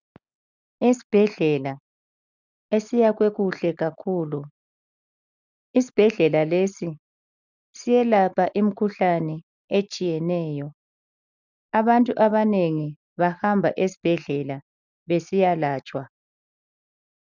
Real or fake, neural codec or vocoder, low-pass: real; none; 7.2 kHz